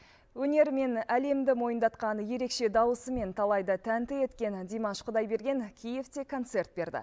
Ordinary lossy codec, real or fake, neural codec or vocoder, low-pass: none; real; none; none